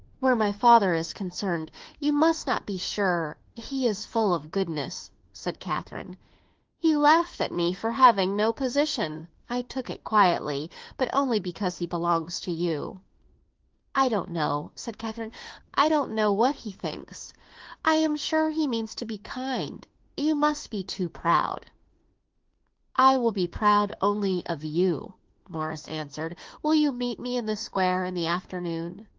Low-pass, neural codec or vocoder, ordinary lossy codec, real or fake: 7.2 kHz; autoencoder, 48 kHz, 32 numbers a frame, DAC-VAE, trained on Japanese speech; Opus, 16 kbps; fake